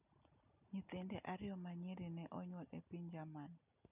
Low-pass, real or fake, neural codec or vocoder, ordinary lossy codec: 3.6 kHz; real; none; none